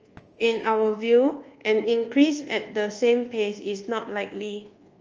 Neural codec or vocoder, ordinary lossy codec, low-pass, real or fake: codec, 24 kHz, 1.2 kbps, DualCodec; Opus, 24 kbps; 7.2 kHz; fake